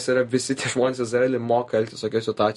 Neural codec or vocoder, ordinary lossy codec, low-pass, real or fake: none; MP3, 48 kbps; 14.4 kHz; real